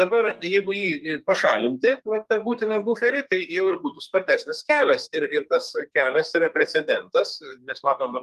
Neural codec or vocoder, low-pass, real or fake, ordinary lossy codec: codec, 32 kHz, 1.9 kbps, SNAC; 14.4 kHz; fake; Opus, 32 kbps